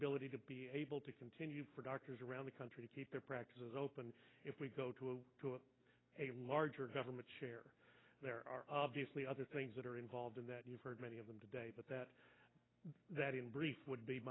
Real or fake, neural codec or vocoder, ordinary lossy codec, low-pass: real; none; AAC, 16 kbps; 7.2 kHz